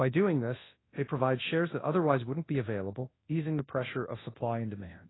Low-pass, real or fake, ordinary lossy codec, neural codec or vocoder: 7.2 kHz; fake; AAC, 16 kbps; codec, 24 kHz, 0.9 kbps, WavTokenizer, large speech release